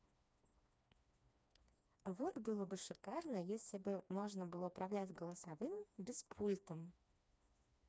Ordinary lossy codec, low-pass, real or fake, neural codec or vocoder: none; none; fake; codec, 16 kHz, 2 kbps, FreqCodec, smaller model